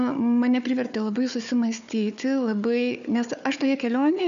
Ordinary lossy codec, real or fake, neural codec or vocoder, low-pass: AAC, 96 kbps; fake; codec, 16 kHz, 4 kbps, FunCodec, trained on Chinese and English, 50 frames a second; 7.2 kHz